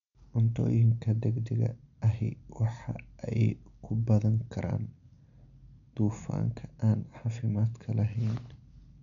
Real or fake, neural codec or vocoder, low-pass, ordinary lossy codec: real; none; 7.2 kHz; none